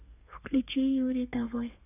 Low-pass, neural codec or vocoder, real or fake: 3.6 kHz; codec, 32 kHz, 1.9 kbps, SNAC; fake